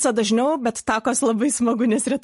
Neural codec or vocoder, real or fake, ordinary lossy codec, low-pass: none; real; MP3, 48 kbps; 14.4 kHz